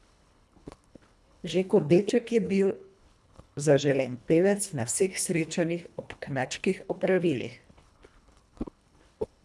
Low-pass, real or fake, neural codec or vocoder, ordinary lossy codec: none; fake; codec, 24 kHz, 1.5 kbps, HILCodec; none